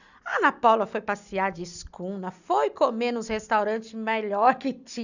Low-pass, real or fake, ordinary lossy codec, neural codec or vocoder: 7.2 kHz; real; none; none